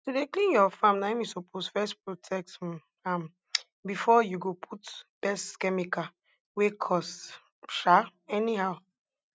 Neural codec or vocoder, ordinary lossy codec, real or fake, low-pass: none; none; real; none